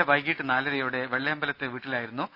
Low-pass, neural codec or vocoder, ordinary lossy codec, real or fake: 5.4 kHz; none; none; real